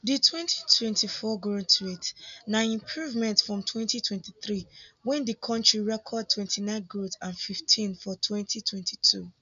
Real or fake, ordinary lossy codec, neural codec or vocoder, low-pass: real; none; none; 7.2 kHz